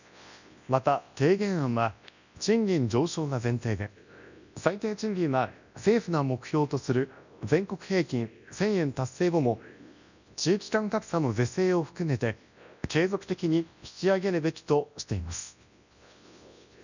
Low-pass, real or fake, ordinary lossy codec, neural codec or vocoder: 7.2 kHz; fake; none; codec, 24 kHz, 0.9 kbps, WavTokenizer, large speech release